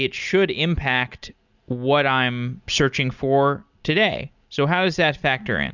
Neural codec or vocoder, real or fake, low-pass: none; real; 7.2 kHz